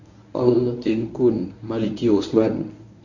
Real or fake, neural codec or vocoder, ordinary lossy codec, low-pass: fake; codec, 24 kHz, 0.9 kbps, WavTokenizer, medium speech release version 1; none; 7.2 kHz